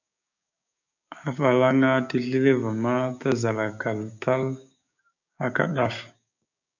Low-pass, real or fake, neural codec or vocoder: 7.2 kHz; fake; codec, 16 kHz, 6 kbps, DAC